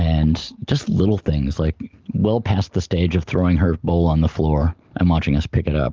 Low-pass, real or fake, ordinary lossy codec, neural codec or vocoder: 7.2 kHz; real; Opus, 24 kbps; none